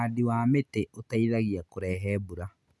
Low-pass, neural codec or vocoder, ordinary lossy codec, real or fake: none; none; none; real